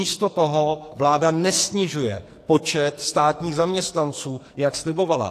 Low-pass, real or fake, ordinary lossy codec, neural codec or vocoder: 14.4 kHz; fake; AAC, 64 kbps; codec, 44.1 kHz, 2.6 kbps, SNAC